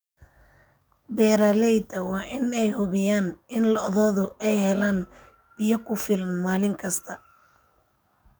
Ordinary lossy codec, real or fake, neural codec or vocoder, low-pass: none; fake; codec, 44.1 kHz, 7.8 kbps, DAC; none